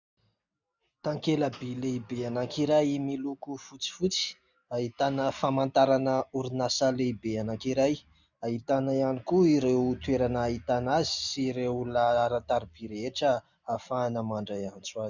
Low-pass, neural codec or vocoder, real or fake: 7.2 kHz; none; real